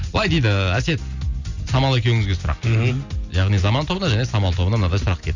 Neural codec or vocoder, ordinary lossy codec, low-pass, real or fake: none; Opus, 64 kbps; 7.2 kHz; real